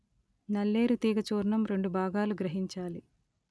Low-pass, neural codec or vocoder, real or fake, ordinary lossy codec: none; none; real; none